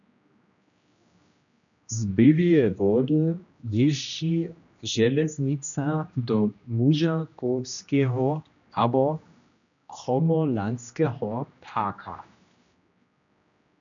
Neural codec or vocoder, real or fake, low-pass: codec, 16 kHz, 1 kbps, X-Codec, HuBERT features, trained on general audio; fake; 7.2 kHz